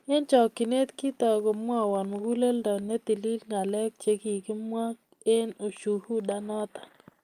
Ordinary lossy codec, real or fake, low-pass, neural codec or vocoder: Opus, 32 kbps; real; 14.4 kHz; none